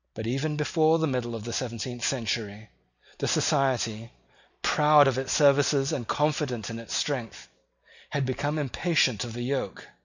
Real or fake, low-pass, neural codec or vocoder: real; 7.2 kHz; none